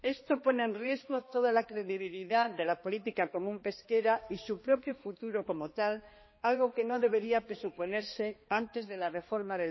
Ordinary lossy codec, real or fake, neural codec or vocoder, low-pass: MP3, 24 kbps; fake; codec, 16 kHz, 2 kbps, X-Codec, HuBERT features, trained on balanced general audio; 7.2 kHz